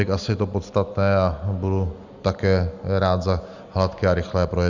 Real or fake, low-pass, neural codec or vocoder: real; 7.2 kHz; none